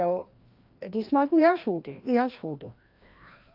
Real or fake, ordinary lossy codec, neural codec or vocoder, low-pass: fake; Opus, 24 kbps; codec, 16 kHz, 1 kbps, FreqCodec, larger model; 5.4 kHz